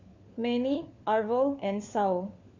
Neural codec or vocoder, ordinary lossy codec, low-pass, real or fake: codec, 16 kHz, 4 kbps, FunCodec, trained on LibriTTS, 50 frames a second; MP3, 48 kbps; 7.2 kHz; fake